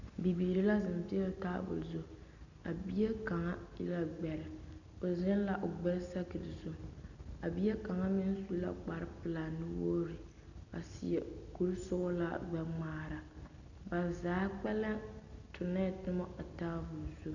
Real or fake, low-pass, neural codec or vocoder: real; 7.2 kHz; none